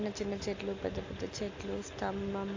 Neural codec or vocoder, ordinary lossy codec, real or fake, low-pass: none; AAC, 48 kbps; real; 7.2 kHz